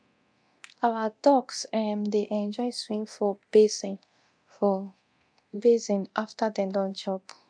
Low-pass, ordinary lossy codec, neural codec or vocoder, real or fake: 9.9 kHz; MP3, 64 kbps; codec, 24 kHz, 0.9 kbps, DualCodec; fake